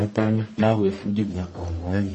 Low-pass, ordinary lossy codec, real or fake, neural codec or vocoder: 10.8 kHz; MP3, 32 kbps; fake; codec, 44.1 kHz, 1.7 kbps, Pupu-Codec